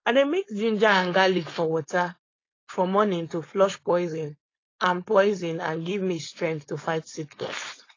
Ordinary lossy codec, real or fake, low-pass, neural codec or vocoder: AAC, 32 kbps; fake; 7.2 kHz; codec, 16 kHz, 4.8 kbps, FACodec